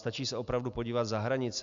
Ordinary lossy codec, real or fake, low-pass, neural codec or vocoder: Opus, 64 kbps; real; 7.2 kHz; none